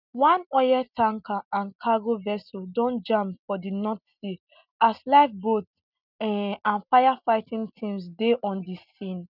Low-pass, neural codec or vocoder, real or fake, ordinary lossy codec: 5.4 kHz; none; real; none